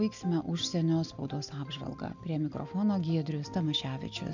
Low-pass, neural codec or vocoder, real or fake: 7.2 kHz; none; real